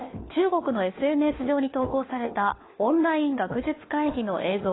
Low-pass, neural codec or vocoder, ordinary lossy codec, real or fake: 7.2 kHz; codec, 16 kHz, 4 kbps, FunCodec, trained on LibriTTS, 50 frames a second; AAC, 16 kbps; fake